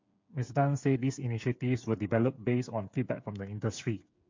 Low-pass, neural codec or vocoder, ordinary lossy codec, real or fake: 7.2 kHz; codec, 16 kHz, 8 kbps, FreqCodec, smaller model; MP3, 48 kbps; fake